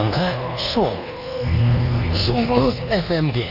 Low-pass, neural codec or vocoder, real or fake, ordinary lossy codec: 5.4 kHz; codec, 24 kHz, 1.2 kbps, DualCodec; fake; Opus, 64 kbps